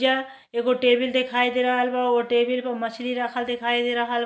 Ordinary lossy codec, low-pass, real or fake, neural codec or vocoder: none; none; real; none